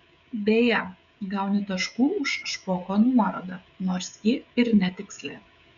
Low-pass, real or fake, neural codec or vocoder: 7.2 kHz; fake; codec, 16 kHz, 16 kbps, FreqCodec, smaller model